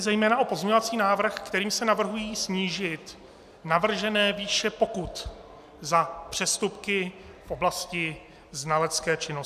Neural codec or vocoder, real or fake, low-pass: none; real; 14.4 kHz